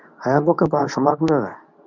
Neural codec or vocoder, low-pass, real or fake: codec, 24 kHz, 0.9 kbps, WavTokenizer, medium speech release version 2; 7.2 kHz; fake